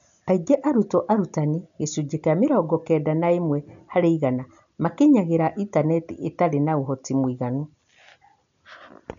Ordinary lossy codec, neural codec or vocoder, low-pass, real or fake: none; none; 7.2 kHz; real